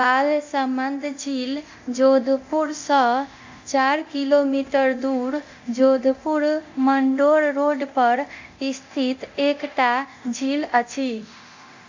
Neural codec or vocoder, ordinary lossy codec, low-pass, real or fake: codec, 24 kHz, 0.9 kbps, DualCodec; MP3, 64 kbps; 7.2 kHz; fake